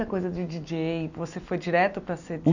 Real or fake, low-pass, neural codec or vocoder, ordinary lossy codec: real; 7.2 kHz; none; none